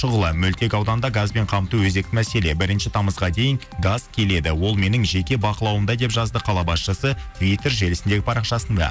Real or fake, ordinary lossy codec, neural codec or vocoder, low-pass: real; none; none; none